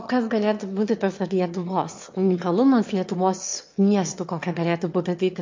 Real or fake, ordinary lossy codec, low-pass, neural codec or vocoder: fake; MP3, 48 kbps; 7.2 kHz; autoencoder, 22.05 kHz, a latent of 192 numbers a frame, VITS, trained on one speaker